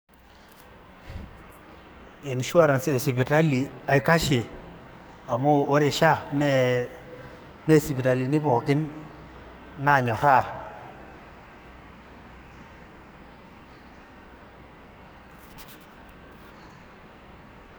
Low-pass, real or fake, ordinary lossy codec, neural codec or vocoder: none; fake; none; codec, 44.1 kHz, 2.6 kbps, SNAC